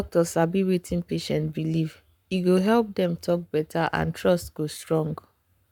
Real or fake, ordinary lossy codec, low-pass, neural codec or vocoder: fake; none; 19.8 kHz; codec, 44.1 kHz, 7.8 kbps, Pupu-Codec